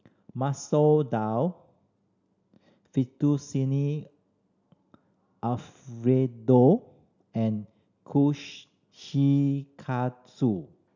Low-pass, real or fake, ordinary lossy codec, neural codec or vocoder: 7.2 kHz; real; none; none